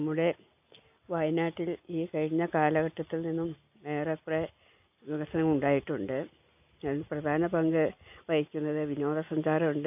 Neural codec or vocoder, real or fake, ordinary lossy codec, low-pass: none; real; AAC, 32 kbps; 3.6 kHz